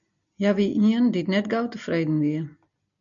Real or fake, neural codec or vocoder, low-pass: real; none; 7.2 kHz